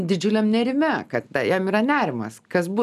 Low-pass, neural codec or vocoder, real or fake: 14.4 kHz; none; real